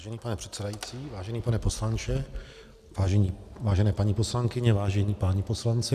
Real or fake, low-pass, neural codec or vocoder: real; 14.4 kHz; none